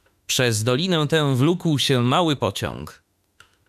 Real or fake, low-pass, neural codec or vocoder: fake; 14.4 kHz; autoencoder, 48 kHz, 32 numbers a frame, DAC-VAE, trained on Japanese speech